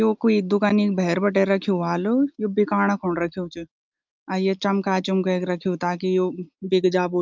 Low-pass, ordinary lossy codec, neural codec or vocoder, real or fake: 7.2 kHz; Opus, 24 kbps; none; real